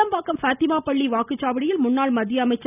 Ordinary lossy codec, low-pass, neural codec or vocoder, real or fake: none; 3.6 kHz; none; real